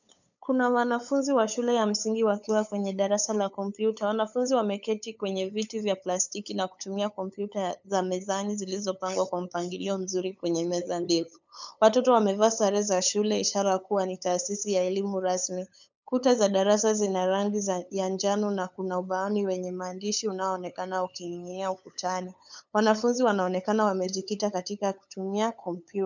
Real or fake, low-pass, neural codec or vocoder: fake; 7.2 kHz; codec, 16 kHz, 8 kbps, FunCodec, trained on LibriTTS, 25 frames a second